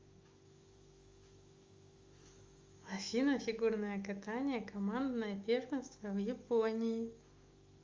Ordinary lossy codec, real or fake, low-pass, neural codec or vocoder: Opus, 32 kbps; fake; 7.2 kHz; autoencoder, 48 kHz, 128 numbers a frame, DAC-VAE, trained on Japanese speech